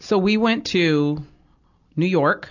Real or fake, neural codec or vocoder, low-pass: real; none; 7.2 kHz